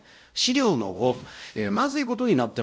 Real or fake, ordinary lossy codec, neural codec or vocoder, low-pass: fake; none; codec, 16 kHz, 0.5 kbps, X-Codec, WavLM features, trained on Multilingual LibriSpeech; none